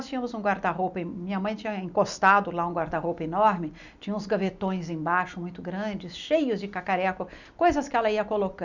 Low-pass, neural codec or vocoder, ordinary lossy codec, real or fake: 7.2 kHz; none; none; real